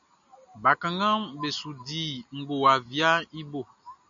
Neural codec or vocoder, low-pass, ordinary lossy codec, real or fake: none; 7.2 kHz; MP3, 96 kbps; real